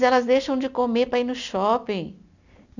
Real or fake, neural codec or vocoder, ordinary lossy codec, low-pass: real; none; none; 7.2 kHz